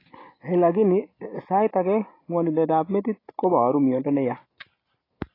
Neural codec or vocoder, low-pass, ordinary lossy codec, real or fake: none; 5.4 kHz; AAC, 24 kbps; real